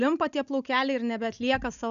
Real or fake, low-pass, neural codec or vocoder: real; 7.2 kHz; none